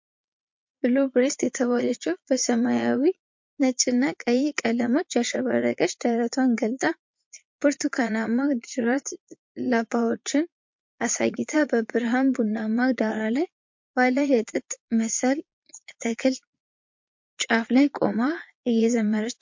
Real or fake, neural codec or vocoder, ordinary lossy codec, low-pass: fake; vocoder, 22.05 kHz, 80 mel bands, Vocos; MP3, 48 kbps; 7.2 kHz